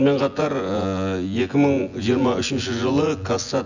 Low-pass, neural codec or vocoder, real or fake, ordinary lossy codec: 7.2 kHz; vocoder, 24 kHz, 100 mel bands, Vocos; fake; none